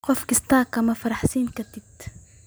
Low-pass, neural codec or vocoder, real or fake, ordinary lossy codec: none; none; real; none